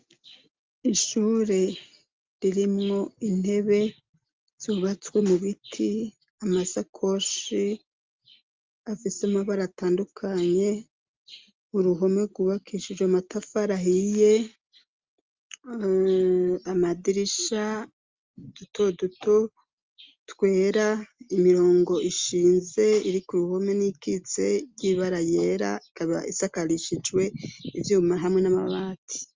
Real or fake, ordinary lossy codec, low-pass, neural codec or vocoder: real; Opus, 32 kbps; 7.2 kHz; none